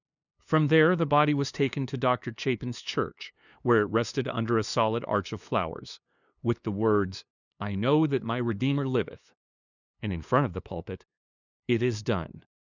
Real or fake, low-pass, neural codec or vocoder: fake; 7.2 kHz; codec, 16 kHz, 2 kbps, FunCodec, trained on LibriTTS, 25 frames a second